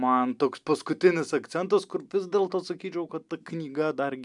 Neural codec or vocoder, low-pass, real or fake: none; 10.8 kHz; real